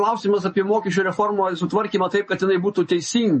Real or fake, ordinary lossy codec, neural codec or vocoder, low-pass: real; MP3, 32 kbps; none; 10.8 kHz